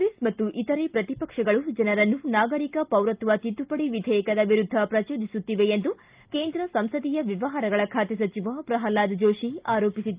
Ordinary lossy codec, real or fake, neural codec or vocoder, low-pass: Opus, 32 kbps; real; none; 3.6 kHz